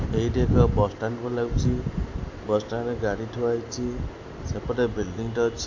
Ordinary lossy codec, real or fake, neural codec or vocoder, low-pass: AAC, 48 kbps; real; none; 7.2 kHz